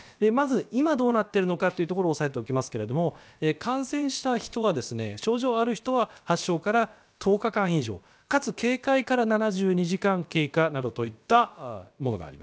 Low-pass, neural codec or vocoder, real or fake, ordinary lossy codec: none; codec, 16 kHz, about 1 kbps, DyCAST, with the encoder's durations; fake; none